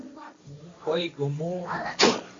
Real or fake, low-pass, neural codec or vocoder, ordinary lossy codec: fake; 7.2 kHz; codec, 16 kHz, 1.1 kbps, Voila-Tokenizer; AAC, 32 kbps